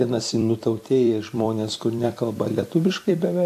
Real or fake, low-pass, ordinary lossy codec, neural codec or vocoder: fake; 14.4 kHz; AAC, 64 kbps; vocoder, 44.1 kHz, 128 mel bands every 256 samples, BigVGAN v2